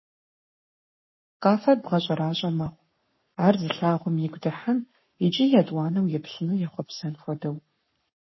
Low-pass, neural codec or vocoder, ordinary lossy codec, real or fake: 7.2 kHz; codec, 44.1 kHz, 7.8 kbps, Pupu-Codec; MP3, 24 kbps; fake